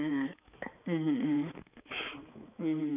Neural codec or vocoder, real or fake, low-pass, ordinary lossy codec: codec, 16 kHz, 4 kbps, X-Codec, HuBERT features, trained on balanced general audio; fake; 3.6 kHz; none